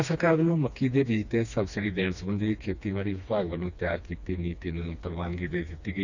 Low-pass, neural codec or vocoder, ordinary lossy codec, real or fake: 7.2 kHz; codec, 16 kHz, 2 kbps, FreqCodec, smaller model; none; fake